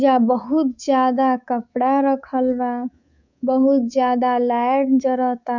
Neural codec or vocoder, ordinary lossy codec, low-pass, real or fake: codec, 24 kHz, 3.1 kbps, DualCodec; none; 7.2 kHz; fake